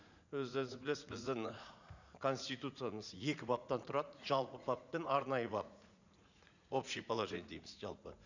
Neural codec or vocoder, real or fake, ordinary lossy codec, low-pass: vocoder, 44.1 kHz, 80 mel bands, Vocos; fake; none; 7.2 kHz